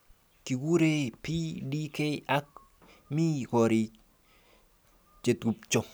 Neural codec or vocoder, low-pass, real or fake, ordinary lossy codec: none; none; real; none